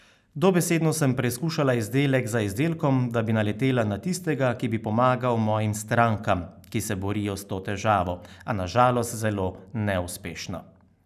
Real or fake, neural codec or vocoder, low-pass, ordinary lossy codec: real; none; 14.4 kHz; none